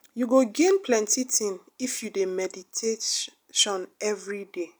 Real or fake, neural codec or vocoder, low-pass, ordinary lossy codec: real; none; none; none